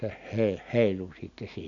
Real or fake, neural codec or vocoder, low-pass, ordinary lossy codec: real; none; 7.2 kHz; none